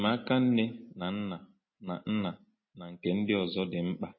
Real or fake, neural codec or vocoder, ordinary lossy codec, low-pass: real; none; MP3, 24 kbps; 7.2 kHz